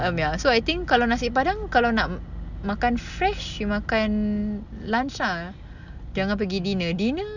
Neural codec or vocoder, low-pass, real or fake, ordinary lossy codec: none; 7.2 kHz; real; none